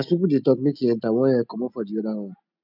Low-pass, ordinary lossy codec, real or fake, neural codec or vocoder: 5.4 kHz; none; real; none